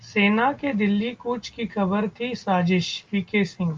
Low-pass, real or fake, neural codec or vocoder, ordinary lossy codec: 7.2 kHz; real; none; Opus, 24 kbps